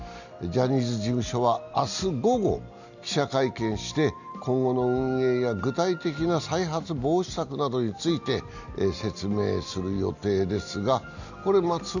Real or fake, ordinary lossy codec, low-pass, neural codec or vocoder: real; none; 7.2 kHz; none